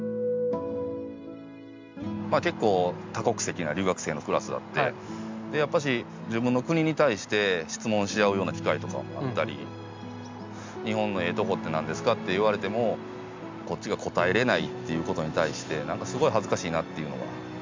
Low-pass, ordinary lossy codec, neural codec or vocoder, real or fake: 7.2 kHz; MP3, 64 kbps; none; real